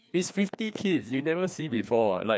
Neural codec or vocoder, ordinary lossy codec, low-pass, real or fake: codec, 16 kHz, 2 kbps, FreqCodec, larger model; none; none; fake